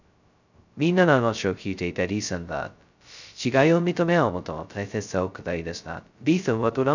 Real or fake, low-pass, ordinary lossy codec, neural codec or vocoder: fake; 7.2 kHz; none; codec, 16 kHz, 0.2 kbps, FocalCodec